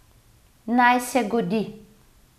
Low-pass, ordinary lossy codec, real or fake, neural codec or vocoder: 14.4 kHz; none; real; none